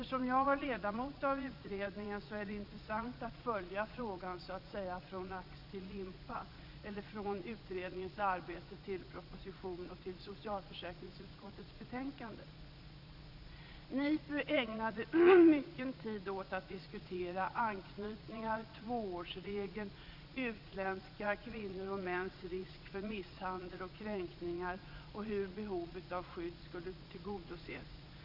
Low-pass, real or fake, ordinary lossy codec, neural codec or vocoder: 5.4 kHz; fake; none; vocoder, 22.05 kHz, 80 mel bands, Vocos